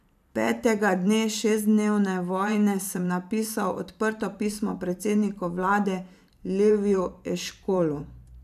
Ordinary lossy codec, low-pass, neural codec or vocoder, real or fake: none; 14.4 kHz; vocoder, 44.1 kHz, 128 mel bands every 512 samples, BigVGAN v2; fake